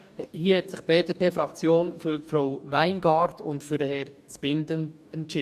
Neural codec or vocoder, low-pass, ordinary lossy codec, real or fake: codec, 44.1 kHz, 2.6 kbps, DAC; 14.4 kHz; MP3, 96 kbps; fake